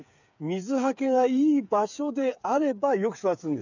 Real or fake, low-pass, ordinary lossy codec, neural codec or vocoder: fake; 7.2 kHz; none; codec, 16 kHz, 8 kbps, FreqCodec, smaller model